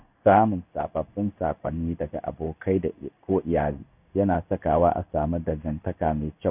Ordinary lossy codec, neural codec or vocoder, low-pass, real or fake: none; codec, 16 kHz in and 24 kHz out, 1 kbps, XY-Tokenizer; 3.6 kHz; fake